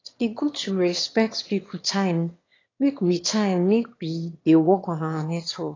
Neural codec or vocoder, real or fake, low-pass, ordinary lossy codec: autoencoder, 22.05 kHz, a latent of 192 numbers a frame, VITS, trained on one speaker; fake; 7.2 kHz; AAC, 32 kbps